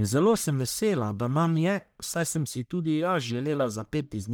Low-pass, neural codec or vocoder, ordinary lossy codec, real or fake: none; codec, 44.1 kHz, 1.7 kbps, Pupu-Codec; none; fake